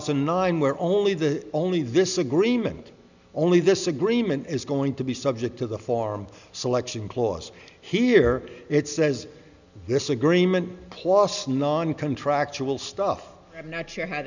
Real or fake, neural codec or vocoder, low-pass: real; none; 7.2 kHz